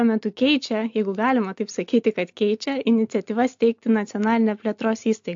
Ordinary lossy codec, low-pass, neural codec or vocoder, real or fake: AAC, 64 kbps; 7.2 kHz; none; real